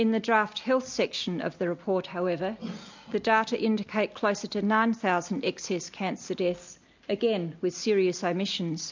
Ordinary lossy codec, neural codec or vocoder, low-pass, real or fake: MP3, 64 kbps; none; 7.2 kHz; real